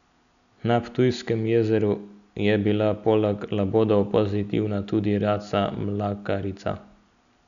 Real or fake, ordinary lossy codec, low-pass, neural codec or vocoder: real; none; 7.2 kHz; none